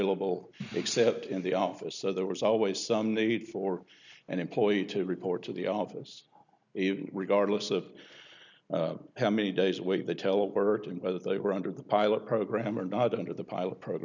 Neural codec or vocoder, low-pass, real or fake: none; 7.2 kHz; real